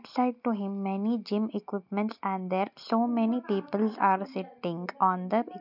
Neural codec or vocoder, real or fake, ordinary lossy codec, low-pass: none; real; none; 5.4 kHz